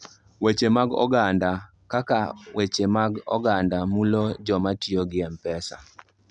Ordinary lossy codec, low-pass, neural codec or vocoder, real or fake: none; 10.8 kHz; none; real